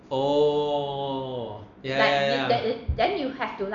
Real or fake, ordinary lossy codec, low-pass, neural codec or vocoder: real; none; 7.2 kHz; none